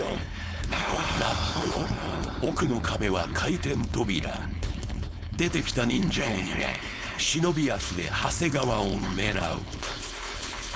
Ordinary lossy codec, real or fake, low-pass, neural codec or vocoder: none; fake; none; codec, 16 kHz, 4.8 kbps, FACodec